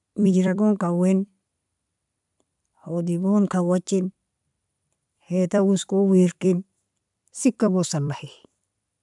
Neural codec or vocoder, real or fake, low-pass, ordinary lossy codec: vocoder, 44.1 kHz, 128 mel bands every 256 samples, BigVGAN v2; fake; 10.8 kHz; none